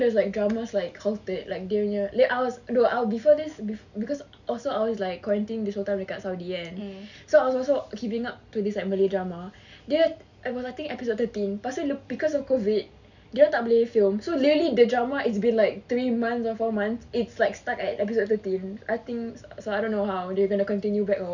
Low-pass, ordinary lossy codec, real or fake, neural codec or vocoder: 7.2 kHz; none; real; none